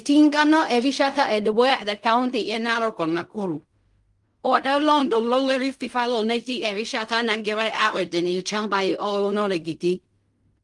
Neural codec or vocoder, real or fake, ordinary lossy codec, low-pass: codec, 16 kHz in and 24 kHz out, 0.4 kbps, LongCat-Audio-Codec, fine tuned four codebook decoder; fake; Opus, 32 kbps; 10.8 kHz